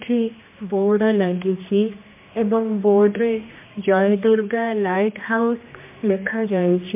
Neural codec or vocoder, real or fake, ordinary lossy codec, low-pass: codec, 16 kHz, 1 kbps, X-Codec, HuBERT features, trained on general audio; fake; MP3, 32 kbps; 3.6 kHz